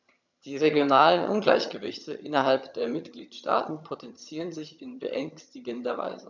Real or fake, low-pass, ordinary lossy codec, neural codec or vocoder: fake; 7.2 kHz; none; vocoder, 22.05 kHz, 80 mel bands, HiFi-GAN